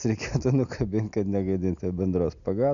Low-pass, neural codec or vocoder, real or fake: 7.2 kHz; none; real